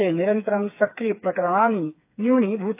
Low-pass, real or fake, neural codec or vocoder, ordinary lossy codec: 3.6 kHz; fake; codec, 16 kHz, 4 kbps, FreqCodec, smaller model; AAC, 24 kbps